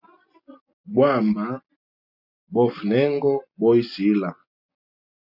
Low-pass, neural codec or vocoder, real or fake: 5.4 kHz; none; real